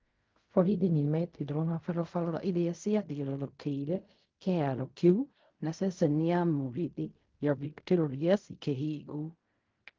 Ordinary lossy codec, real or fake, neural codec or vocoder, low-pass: Opus, 32 kbps; fake; codec, 16 kHz in and 24 kHz out, 0.4 kbps, LongCat-Audio-Codec, fine tuned four codebook decoder; 7.2 kHz